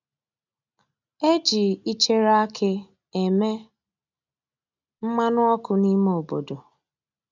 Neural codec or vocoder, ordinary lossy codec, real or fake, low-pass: none; none; real; 7.2 kHz